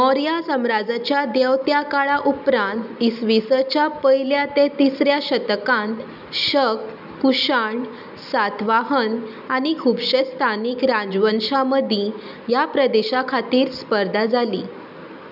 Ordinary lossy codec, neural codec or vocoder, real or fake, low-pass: none; none; real; 5.4 kHz